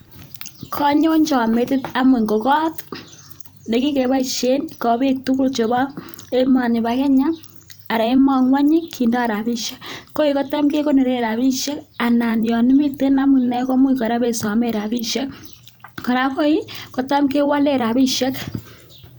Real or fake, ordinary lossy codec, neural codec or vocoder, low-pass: fake; none; vocoder, 44.1 kHz, 128 mel bands every 512 samples, BigVGAN v2; none